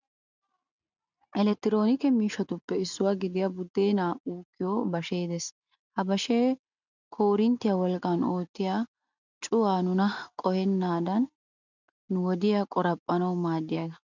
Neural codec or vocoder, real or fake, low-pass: none; real; 7.2 kHz